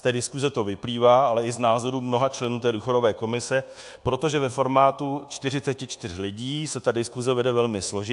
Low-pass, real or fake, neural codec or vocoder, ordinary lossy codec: 10.8 kHz; fake; codec, 24 kHz, 1.2 kbps, DualCodec; AAC, 64 kbps